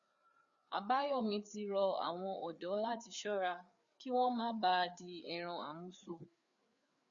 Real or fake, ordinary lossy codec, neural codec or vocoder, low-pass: fake; Opus, 64 kbps; codec, 16 kHz, 4 kbps, FreqCodec, larger model; 7.2 kHz